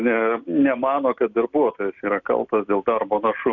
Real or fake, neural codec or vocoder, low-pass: real; none; 7.2 kHz